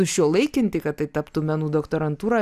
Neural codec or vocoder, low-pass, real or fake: none; 14.4 kHz; real